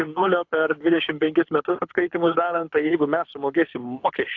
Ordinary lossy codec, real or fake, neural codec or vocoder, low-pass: AAC, 48 kbps; fake; codec, 24 kHz, 6 kbps, HILCodec; 7.2 kHz